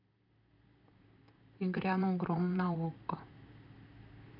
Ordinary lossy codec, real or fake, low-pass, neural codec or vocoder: none; fake; 5.4 kHz; vocoder, 44.1 kHz, 128 mel bands, Pupu-Vocoder